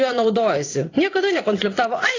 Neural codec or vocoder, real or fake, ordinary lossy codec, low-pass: vocoder, 44.1 kHz, 80 mel bands, Vocos; fake; AAC, 32 kbps; 7.2 kHz